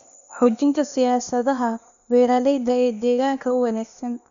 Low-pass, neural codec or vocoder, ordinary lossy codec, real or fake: 7.2 kHz; codec, 16 kHz, 0.8 kbps, ZipCodec; none; fake